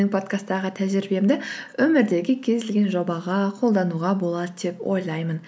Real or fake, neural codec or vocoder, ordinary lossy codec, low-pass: real; none; none; none